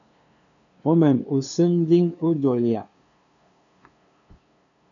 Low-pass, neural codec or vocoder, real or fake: 7.2 kHz; codec, 16 kHz, 2 kbps, FunCodec, trained on LibriTTS, 25 frames a second; fake